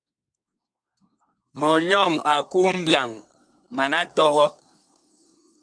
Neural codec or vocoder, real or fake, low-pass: codec, 24 kHz, 1 kbps, SNAC; fake; 9.9 kHz